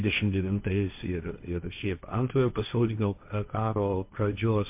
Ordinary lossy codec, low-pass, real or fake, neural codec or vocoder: MP3, 32 kbps; 3.6 kHz; fake; codec, 16 kHz, 1.1 kbps, Voila-Tokenizer